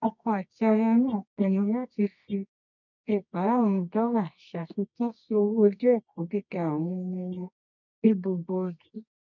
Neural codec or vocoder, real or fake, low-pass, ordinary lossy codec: codec, 24 kHz, 0.9 kbps, WavTokenizer, medium music audio release; fake; 7.2 kHz; none